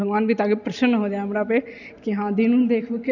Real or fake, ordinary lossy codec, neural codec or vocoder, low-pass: real; none; none; 7.2 kHz